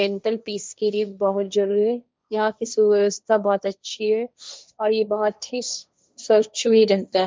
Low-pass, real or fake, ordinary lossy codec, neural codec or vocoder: none; fake; none; codec, 16 kHz, 1.1 kbps, Voila-Tokenizer